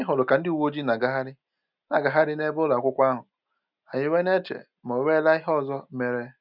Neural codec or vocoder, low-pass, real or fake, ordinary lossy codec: none; 5.4 kHz; real; none